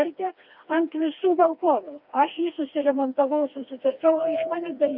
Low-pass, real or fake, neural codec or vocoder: 5.4 kHz; fake; codec, 16 kHz, 2 kbps, FreqCodec, smaller model